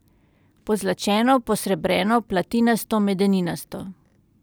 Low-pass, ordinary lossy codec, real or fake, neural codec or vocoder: none; none; real; none